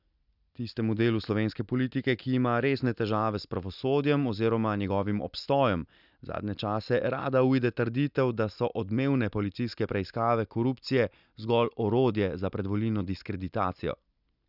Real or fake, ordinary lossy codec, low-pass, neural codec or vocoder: real; none; 5.4 kHz; none